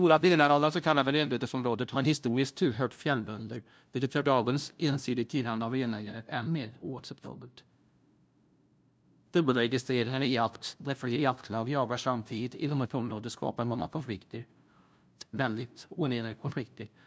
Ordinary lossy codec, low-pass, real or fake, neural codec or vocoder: none; none; fake; codec, 16 kHz, 0.5 kbps, FunCodec, trained on LibriTTS, 25 frames a second